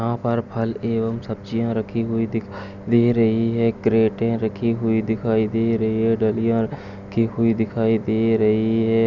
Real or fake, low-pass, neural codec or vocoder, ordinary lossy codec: real; 7.2 kHz; none; none